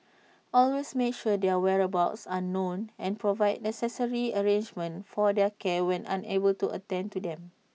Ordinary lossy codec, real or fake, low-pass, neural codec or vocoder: none; real; none; none